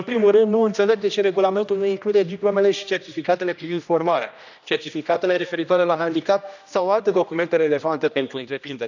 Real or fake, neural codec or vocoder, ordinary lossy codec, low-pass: fake; codec, 16 kHz, 1 kbps, X-Codec, HuBERT features, trained on general audio; none; 7.2 kHz